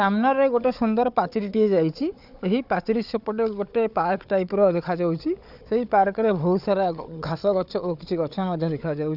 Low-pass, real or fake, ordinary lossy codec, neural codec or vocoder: 5.4 kHz; fake; none; codec, 16 kHz, 4 kbps, FunCodec, trained on Chinese and English, 50 frames a second